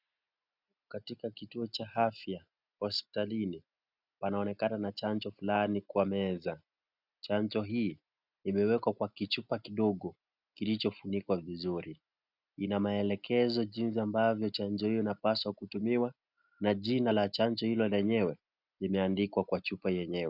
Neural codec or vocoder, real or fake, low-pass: none; real; 5.4 kHz